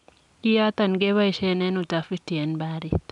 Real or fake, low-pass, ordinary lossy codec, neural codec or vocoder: real; 10.8 kHz; none; none